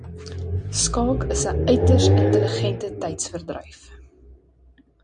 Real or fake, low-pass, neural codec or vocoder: real; 9.9 kHz; none